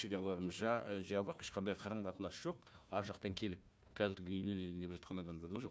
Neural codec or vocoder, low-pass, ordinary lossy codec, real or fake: codec, 16 kHz, 1 kbps, FunCodec, trained on Chinese and English, 50 frames a second; none; none; fake